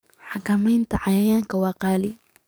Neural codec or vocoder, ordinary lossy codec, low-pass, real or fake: codec, 44.1 kHz, 7.8 kbps, DAC; none; none; fake